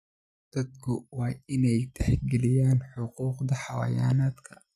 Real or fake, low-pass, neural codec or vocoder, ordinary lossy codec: real; 14.4 kHz; none; none